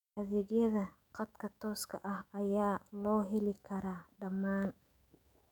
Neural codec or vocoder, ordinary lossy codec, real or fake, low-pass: autoencoder, 48 kHz, 128 numbers a frame, DAC-VAE, trained on Japanese speech; none; fake; 19.8 kHz